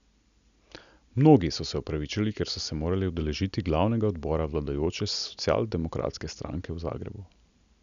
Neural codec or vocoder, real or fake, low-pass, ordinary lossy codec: none; real; 7.2 kHz; none